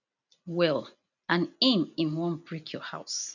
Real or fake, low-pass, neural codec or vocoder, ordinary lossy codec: real; 7.2 kHz; none; none